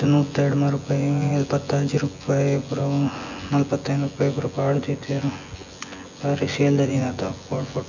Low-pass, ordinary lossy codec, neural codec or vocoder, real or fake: 7.2 kHz; none; vocoder, 24 kHz, 100 mel bands, Vocos; fake